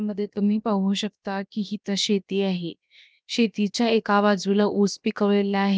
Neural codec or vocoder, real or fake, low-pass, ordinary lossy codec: codec, 16 kHz, 0.7 kbps, FocalCodec; fake; none; none